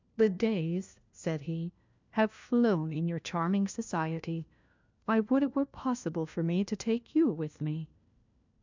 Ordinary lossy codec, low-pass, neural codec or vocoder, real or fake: MP3, 64 kbps; 7.2 kHz; codec, 16 kHz, 1 kbps, FunCodec, trained on LibriTTS, 50 frames a second; fake